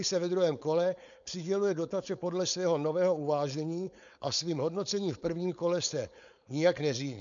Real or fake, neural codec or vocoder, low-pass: fake; codec, 16 kHz, 4.8 kbps, FACodec; 7.2 kHz